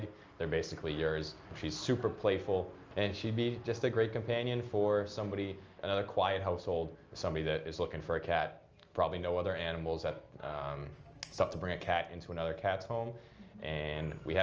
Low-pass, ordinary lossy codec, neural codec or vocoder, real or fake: 7.2 kHz; Opus, 24 kbps; none; real